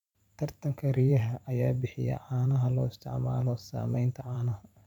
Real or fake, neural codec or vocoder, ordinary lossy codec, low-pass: fake; vocoder, 44.1 kHz, 128 mel bands every 512 samples, BigVGAN v2; none; 19.8 kHz